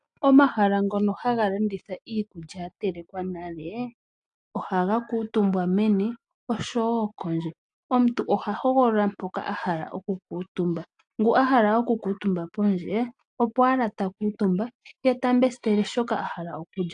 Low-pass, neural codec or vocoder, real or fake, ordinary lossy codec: 9.9 kHz; none; real; AAC, 64 kbps